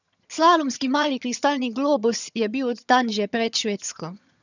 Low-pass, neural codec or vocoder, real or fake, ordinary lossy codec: 7.2 kHz; vocoder, 22.05 kHz, 80 mel bands, HiFi-GAN; fake; none